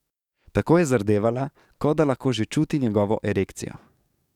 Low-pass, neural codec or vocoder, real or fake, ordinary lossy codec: 19.8 kHz; codec, 44.1 kHz, 7.8 kbps, DAC; fake; none